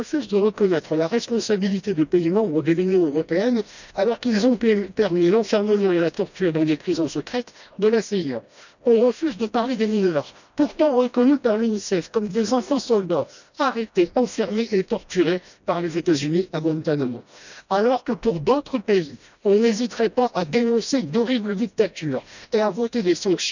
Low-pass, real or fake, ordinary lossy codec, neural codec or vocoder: 7.2 kHz; fake; none; codec, 16 kHz, 1 kbps, FreqCodec, smaller model